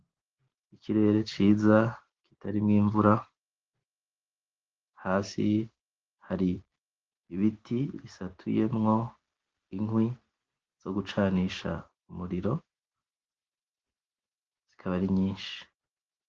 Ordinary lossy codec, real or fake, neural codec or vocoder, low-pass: Opus, 16 kbps; real; none; 7.2 kHz